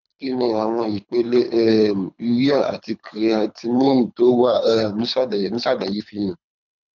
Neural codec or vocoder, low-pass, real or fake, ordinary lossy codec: codec, 24 kHz, 3 kbps, HILCodec; 7.2 kHz; fake; none